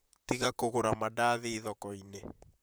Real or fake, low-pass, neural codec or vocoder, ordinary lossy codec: fake; none; vocoder, 44.1 kHz, 128 mel bands, Pupu-Vocoder; none